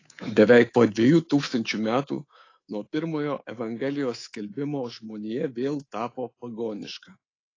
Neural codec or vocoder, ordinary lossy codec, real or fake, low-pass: codec, 24 kHz, 3.1 kbps, DualCodec; AAC, 32 kbps; fake; 7.2 kHz